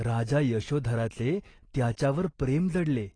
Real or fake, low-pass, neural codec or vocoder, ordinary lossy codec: real; 9.9 kHz; none; AAC, 32 kbps